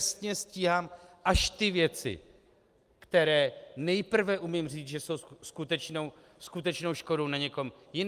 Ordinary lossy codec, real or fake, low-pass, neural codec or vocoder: Opus, 32 kbps; real; 14.4 kHz; none